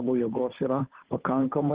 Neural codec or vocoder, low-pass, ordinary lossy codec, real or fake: codec, 16 kHz, 2 kbps, FunCodec, trained on Chinese and English, 25 frames a second; 3.6 kHz; Opus, 16 kbps; fake